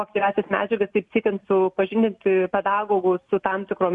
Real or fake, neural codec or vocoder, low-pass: real; none; 10.8 kHz